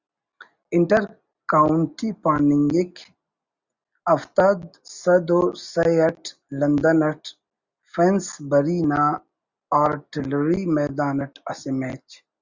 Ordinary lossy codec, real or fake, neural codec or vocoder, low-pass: Opus, 64 kbps; real; none; 7.2 kHz